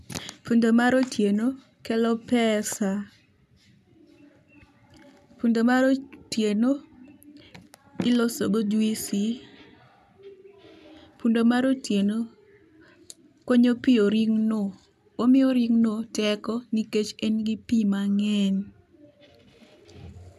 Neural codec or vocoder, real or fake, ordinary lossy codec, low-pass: none; real; none; 14.4 kHz